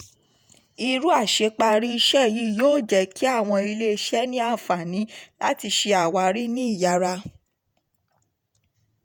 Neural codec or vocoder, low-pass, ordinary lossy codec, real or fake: vocoder, 48 kHz, 128 mel bands, Vocos; 19.8 kHz; none; fake